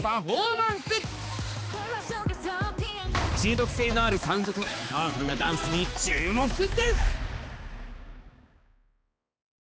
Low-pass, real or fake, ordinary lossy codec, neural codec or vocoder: none; fake; none; codec, 16 kHz, 2 kbps, X-Codec, HuBERT features, trained on balanced general audio